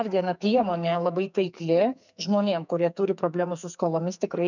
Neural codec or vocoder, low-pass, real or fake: codec, 44.1 kHz, 2.6 kbps, SNAC; 7.2 kHz; fake